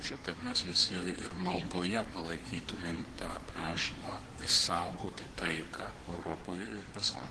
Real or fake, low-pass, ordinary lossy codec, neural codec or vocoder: fake; 10.8 kHz; Opus, 16 kbps; codec, 24 kHz, 1 kbps, SNAC